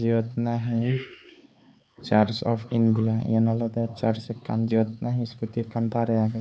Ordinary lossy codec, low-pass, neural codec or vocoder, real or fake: none; none; codec, 16 kHz, 4 kbps, X-Codec, HuBERT features, trained on LibriSpeech; fake